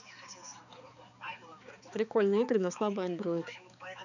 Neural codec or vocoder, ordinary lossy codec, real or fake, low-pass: codec, 16 kHz, 4 kbps, X-Codec, HuBERT features, trained on balanced general audio; none; fake; 7.2 kHz